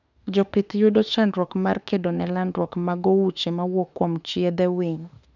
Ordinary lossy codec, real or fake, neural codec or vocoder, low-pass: none; fake; autoencoder, 48 kHz, 32 numbers a frame, DAC-VAE, trained on Japanese speech; 7.2 kHz